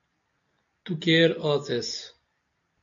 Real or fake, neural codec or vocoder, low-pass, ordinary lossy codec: real; none; 7.2 kHz; MP3, 64 kbps